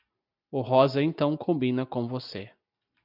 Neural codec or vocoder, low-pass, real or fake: none; 5.4 kHz; real